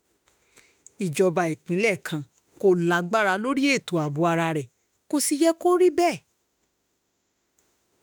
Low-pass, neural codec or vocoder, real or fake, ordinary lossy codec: none; autoencoder, 48 kHz, 32 numbers a frame, DAC-VAE, trained on Japanese speech; fake; none